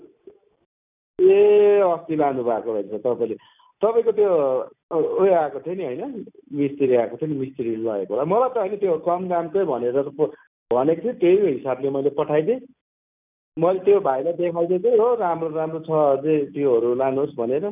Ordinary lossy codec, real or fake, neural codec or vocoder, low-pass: none; real; none; 3.6 kHz